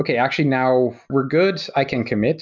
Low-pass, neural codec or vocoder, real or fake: 7.2 kHz; none; real